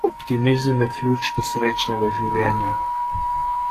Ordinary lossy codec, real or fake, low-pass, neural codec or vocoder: AAC, 64 kbps; fake; 14.4 kHz; codec, 44.1 kHz, 2.6 kbps, SNAC